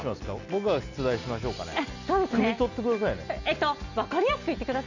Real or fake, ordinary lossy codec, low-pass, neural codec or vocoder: real; none; 7.2 kHz; none